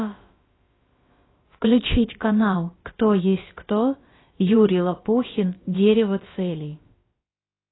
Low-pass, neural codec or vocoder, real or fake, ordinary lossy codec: 7.2 kHz; codec, 16 kHz, about 1 kbps, DyCAST, with the encoder's durations; fake; AAC, 16 kbps